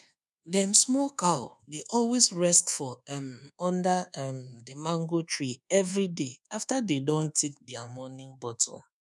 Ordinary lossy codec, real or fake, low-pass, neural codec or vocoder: none; fake; none; codec, 24 kHz, 1.2 kbps, DualCodec